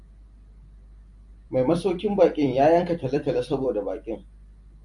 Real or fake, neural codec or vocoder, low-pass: fake; vocoder, 44.1 kHz, 128 mel bands every 256 samples, BigVGAN v2; 10.8 kHz